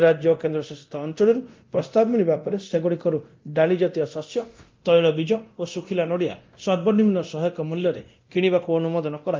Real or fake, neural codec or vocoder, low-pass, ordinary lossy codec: fake; codec, 24 kHz, 0.9 kbps, DualCodec; 7.2 kHz; Opus, 32 kbps